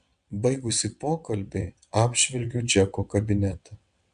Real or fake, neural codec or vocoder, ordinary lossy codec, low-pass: fake; vocoder, 22.05 kHz, 80 mel bands, WaveNeXt; AAC, 96 kbps; 9.9 kHz